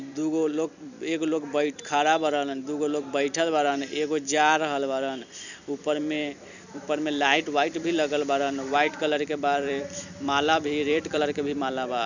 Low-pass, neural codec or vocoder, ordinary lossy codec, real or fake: 7.2 kHz; vocoder, 44.1 kHz, 128 mel bands every 256 samples, BigVGAN v2; none; fake